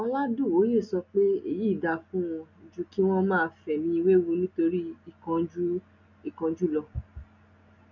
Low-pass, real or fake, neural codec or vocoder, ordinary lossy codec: 7.2 kHz; real; none; none